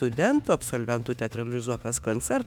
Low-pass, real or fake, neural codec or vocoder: 19.8 kHz; fake; autoencoder, 48 kHz, 32 numbers a frame, DAC-VAE, trained on Japanese speech